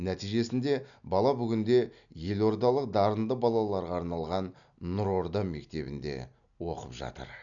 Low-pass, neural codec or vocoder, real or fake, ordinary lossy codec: 7.2 kHz; none; real; none